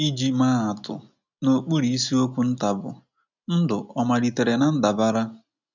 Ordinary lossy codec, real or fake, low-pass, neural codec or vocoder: none; real; 7.2 kHz; none